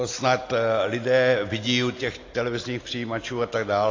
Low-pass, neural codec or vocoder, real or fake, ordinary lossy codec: 7.2 kHz; none; real; AAC, 32 kbps